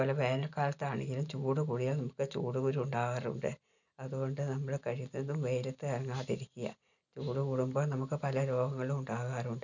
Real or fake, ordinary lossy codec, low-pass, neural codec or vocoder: real; none; 7.2 kHz; none